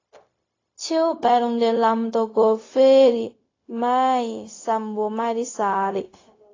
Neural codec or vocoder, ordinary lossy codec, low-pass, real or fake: codec, 16 kHz, 0.4 kbps, LongCat-Audio-Codec; AAC, 32 kbps; 7.2 kHz; fake